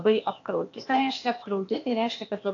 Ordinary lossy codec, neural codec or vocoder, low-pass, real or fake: AAC, 48 kbps; codec, 16 kHz, 0.8 kbps, ZipCodec; 7.2 kHz; fake